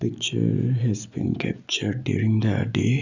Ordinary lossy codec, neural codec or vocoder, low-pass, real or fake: none; none; 7.2 kHz; real